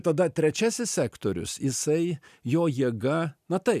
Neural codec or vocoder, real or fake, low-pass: none; real; 14.4 kHz